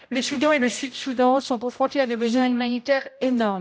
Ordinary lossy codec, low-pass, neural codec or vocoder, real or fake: none; none; codec, 16 kHz, 0.5 kbps, X-Codec, HuBERT features, trained on general audio; fake